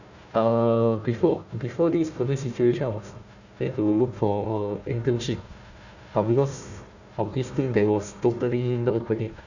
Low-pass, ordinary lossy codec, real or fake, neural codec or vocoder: 7.2 kHz; none; fake; codec, 16 kHz, 1 kbps, FunCodec, trained on Chinese and English, 50 frames a second